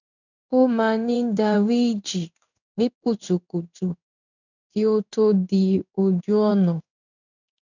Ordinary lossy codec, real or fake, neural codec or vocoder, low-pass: none; fake; codec, 16 kHz in and 24 kHz out, 1 kbps, XY-Tokenizer; 7.2 kHz